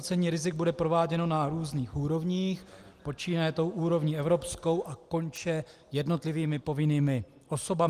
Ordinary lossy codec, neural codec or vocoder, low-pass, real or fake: Opus, 24 kbps; none; 14.4 kHz; real